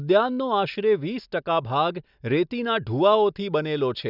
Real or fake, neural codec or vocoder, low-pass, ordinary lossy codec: real; none; 5.4 kHz; none